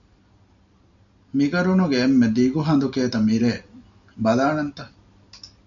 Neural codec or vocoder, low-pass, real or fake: none; 7.2 kHz; real